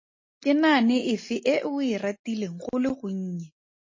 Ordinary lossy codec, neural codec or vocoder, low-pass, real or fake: MP3, 32 kbps; none; 7.2 kHz; real